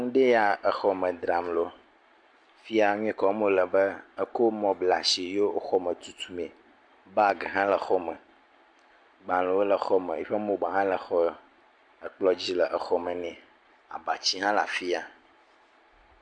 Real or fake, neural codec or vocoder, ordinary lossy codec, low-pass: real; none; MP3, 64 kbps; 9.9 kHz